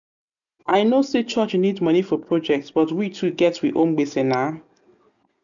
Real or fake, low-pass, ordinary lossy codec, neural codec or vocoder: real; 7.2 kHz; none; none